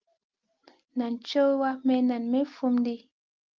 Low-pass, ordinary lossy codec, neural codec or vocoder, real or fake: 7.2 kHz; Opus, 24 kbps; none; real